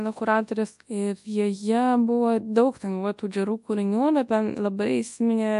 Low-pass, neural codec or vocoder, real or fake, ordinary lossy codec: 10.8 kHz; codec, 24 kHz, 0.9 kbps, WavTokenizer, large speech release; fake; AAC, 96 kbps